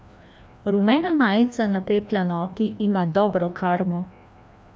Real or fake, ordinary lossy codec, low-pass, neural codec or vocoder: fake; none; none; codec, 16 kHz, 1 kbps, FreqCodec, larger model